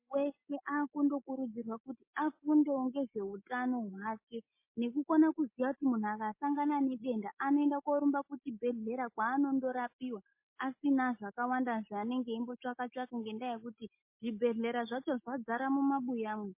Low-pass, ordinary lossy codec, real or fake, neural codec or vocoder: 3.6 kHz; MP3, 24 kbps; real; none